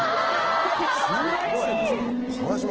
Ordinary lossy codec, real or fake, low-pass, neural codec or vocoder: Opus, 16 kbps; real; 7.2 kHz; none